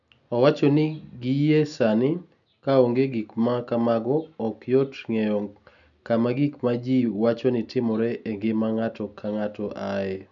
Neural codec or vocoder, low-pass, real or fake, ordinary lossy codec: none; 7.2 kHz; real; none